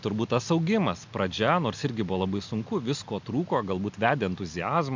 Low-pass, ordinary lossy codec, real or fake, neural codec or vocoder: 7.2 kHz; MP3, 64 kbps; real; none